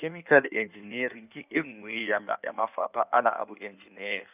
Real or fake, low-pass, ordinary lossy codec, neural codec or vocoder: fake; 3.6 kHz; none; codec, 16 kHz in and 24 kHz out, 1.1 kbps, FireRedTTS-2 codec